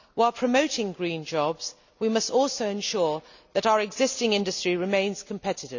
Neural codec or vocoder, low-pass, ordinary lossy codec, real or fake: none; 7.2 kHz; none; real